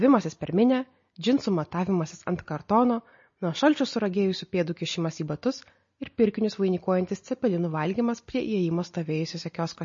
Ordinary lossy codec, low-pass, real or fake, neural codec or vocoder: MP3, 32 kbps; 7.2 kHz; real; none